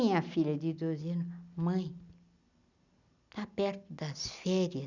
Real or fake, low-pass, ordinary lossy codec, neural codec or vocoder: real; 7.2 kHz; none; none